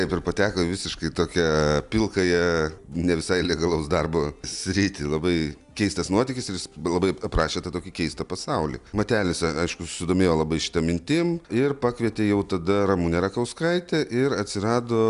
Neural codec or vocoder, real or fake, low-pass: none; real; 10.8 kHz